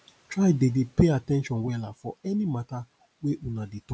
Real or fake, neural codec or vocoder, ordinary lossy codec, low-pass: real; none; none; none